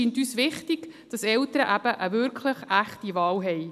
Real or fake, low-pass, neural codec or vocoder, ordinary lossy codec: real; 14.4 kHz; none; none